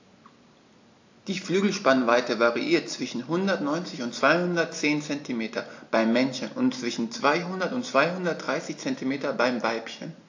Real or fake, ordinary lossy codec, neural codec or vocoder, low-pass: real; AAC, 48 kbps; none; 7.2 kHz